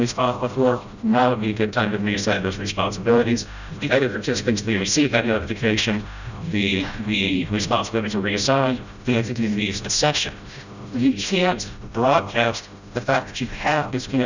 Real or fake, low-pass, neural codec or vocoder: fake; 7.2 kHz; codec, 16 kHz, 0.5 kbps, FreqCodec, smaller model